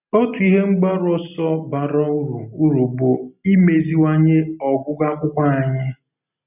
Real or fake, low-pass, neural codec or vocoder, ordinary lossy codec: real; 3.6 kHz; none; none